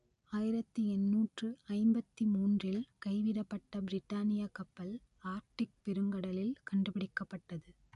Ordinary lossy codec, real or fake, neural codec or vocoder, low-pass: none; real; none; 10.8 kHz